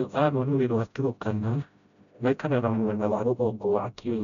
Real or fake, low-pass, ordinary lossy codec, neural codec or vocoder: fake; 7.2 kHz; none; codec, 16 kHz, 0.5 kbps, FreqCodec, smaller model